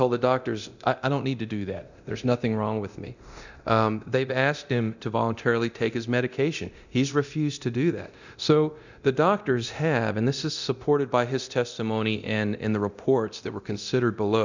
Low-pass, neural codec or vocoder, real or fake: 7.2 kHz; codec, 24 kHz, 0.9 kbps, DualCodec; fake